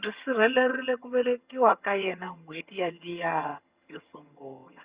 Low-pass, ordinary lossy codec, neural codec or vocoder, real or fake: 3.6 kHz; Opus, 64 kbps; vocoder, 22.05 kHz, 80 mel bands, HiFi-GAN; fake